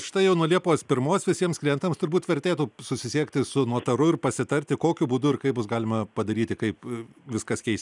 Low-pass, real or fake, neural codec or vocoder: 10.8 kHz; real; none